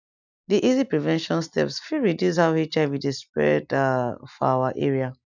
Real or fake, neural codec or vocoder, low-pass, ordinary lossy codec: real; none; 7.2 kHz; none